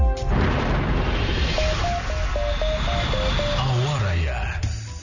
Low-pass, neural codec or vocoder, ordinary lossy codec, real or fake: 7.2 kHz; none; none; real